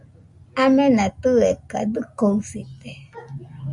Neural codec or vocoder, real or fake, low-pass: none; real; 10.8 kHz